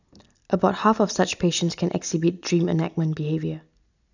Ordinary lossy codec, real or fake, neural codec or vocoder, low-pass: none; real; none; 7.2 kHz